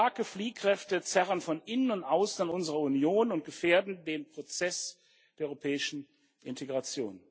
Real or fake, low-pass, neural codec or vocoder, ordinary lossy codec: real; none; none; none